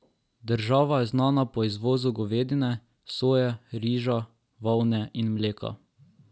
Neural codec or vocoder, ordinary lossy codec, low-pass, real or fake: none; none; none; real